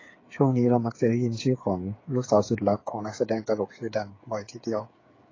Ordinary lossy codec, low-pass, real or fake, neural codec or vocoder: AAC, 32 kbps; 7.2 kHz; fake; codec, 16 kHz, 8 kbps, FreqCodec, smaller model